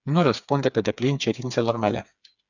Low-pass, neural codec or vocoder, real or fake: 7.2 kHz; codec, 16 kHz, 4 kbps, FreqCodec, smaller model; fake